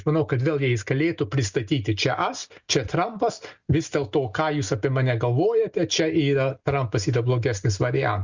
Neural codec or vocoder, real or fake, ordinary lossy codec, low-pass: none; real; Opus, 64 kbps; 7.2 kHz